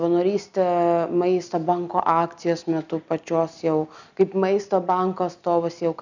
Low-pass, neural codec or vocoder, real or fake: 7.2 kHz; none; real